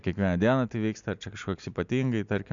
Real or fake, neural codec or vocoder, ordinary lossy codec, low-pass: real; none; MP3, 96 kbps; 7.2 kHz